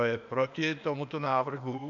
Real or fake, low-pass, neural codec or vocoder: fake; 7.2 kHz; codec, 16 kHz, 0.8 kbps, ZipCodec